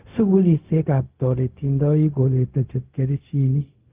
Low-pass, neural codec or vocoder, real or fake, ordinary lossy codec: 3.6 kHz; codec, 16 kHz, 0.4 kbps, LongCat-Audio-Codec; fake; Opus, 32 kbps